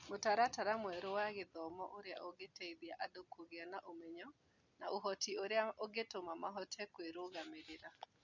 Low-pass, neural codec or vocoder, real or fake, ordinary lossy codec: 7.2 kHz; none; real; none